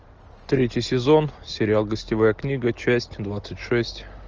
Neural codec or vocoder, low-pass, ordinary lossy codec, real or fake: none; 7.2 kHz; Opus, 24 kbps; real